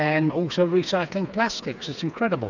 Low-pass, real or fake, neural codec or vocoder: 7.2 kHz; fake; codec, 16 kHz, 4 kbps, FreqCodec, smaller model